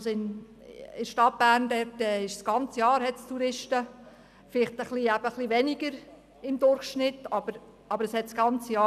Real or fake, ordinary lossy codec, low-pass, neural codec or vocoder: real; none; 14.4 kHz; none